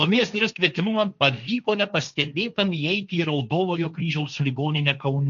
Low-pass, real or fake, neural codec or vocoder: 7.2 kHz; fake; codec, 16 kHz, 1.1 kbps, Voila-Tokenizer